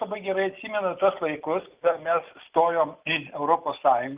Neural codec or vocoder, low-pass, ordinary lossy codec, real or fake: none; 3.6 kHz; Opus, 32 kbps; real